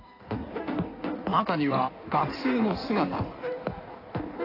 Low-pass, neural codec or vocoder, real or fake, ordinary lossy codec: 5.4 kHz; codec, 16 kHz in and 24 kHz out, 1.1 kbps, FireRedTTS-2 codec; fake; AAC, 32 kbps